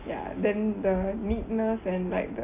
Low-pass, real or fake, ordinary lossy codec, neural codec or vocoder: 3.6 kHz; real; MP3, 24 kbps; none